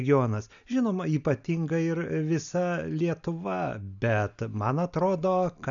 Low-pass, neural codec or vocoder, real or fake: 7.2 kHz; none; real